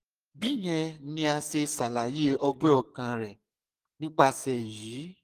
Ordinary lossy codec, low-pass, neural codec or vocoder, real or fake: Opus, 24 kbps; 14.4 kHz; codec, 44.1 kHz, 2.6 kbps, SNAC; fake